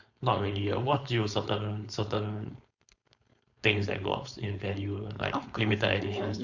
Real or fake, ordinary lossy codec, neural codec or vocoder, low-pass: fake; none; codec, 16 kHz, 4.8 kbps, FACodec; 7.2 kHz